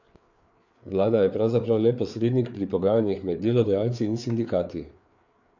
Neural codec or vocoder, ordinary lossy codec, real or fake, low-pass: codec, 16 kHz, 4 kbps, FreqCodec, larger model; none; fake; 7.2 kHz